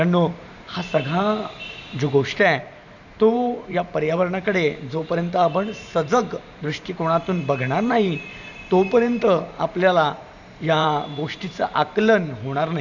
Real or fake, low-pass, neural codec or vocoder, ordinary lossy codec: fake; 7.2 kHz; vocoder, 44.1 kHz, 128 mel bands every 512 samples, BigVGAN v2; none